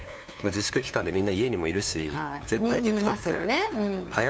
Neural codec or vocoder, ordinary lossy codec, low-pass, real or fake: codec, 16 kHz, 2 kbps, FunCodec, trained on LibriTTS, 25 frames a second; none; none; fake